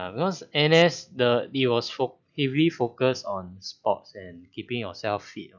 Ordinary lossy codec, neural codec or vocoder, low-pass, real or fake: none; none; 7.2 kHz; real